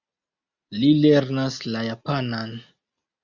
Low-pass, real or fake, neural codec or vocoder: 7.2 kHz; real; none